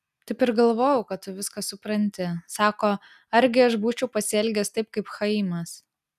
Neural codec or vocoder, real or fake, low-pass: none; real; 14.4 kHz